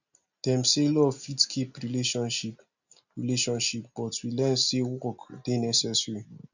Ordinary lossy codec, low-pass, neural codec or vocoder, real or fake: none; 7.2 kHz; none; real